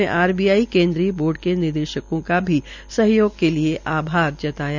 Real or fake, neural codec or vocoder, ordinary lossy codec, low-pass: real; none; none; 7.2 kHz